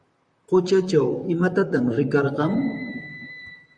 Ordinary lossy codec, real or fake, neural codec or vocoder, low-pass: Opus, 64 kbps; fake; vocoder, 44.1 kHz, 128 mel bands, Pupu-Vocoder; 9.9 kHz